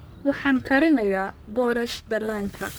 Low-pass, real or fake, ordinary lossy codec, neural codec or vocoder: none; fake; none; codec, 44.1 kHz, 1.7 kbps, Pupu-Codec